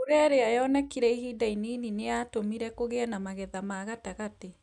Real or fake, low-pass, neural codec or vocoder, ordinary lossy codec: real; 10.8 kHz; none; none